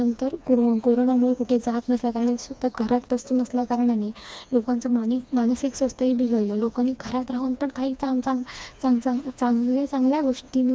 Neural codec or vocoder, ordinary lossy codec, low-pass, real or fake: codec, 16 kHz, 2 kbps, FreqCodec, smaller model; none; none; fake